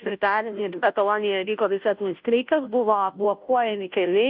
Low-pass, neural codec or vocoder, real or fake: 5.4 kHz; codec, 16 kHz, 0.5 kbps, FunCodec, trained on Chinese and English, 25 frames a second; fake